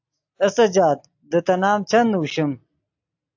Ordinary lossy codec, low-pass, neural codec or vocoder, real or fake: AAC, 48 kbps; 7.2 kHz; none; real